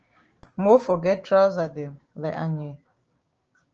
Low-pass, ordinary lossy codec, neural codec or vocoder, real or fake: 7.2 kHz; Opus, 24 kbps; codec, 16 kHz, 6 kbps, DAC; fake